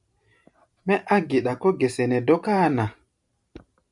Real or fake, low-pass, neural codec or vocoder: fake; 10.8 kHz; vocoder, 44.1 kHz, 128 mel bands every 512 samples, BigVGAN v2